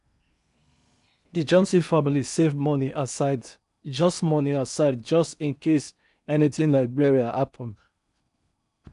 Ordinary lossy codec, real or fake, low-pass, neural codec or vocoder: none; fake; 10.8 kHz; codec, 16 kHz in and 24 kHz out, 0.8 kbps, FocalCodec, streaming, 65536 codes